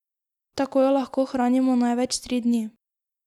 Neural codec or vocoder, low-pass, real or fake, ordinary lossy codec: none; 19.8 kHz; real; none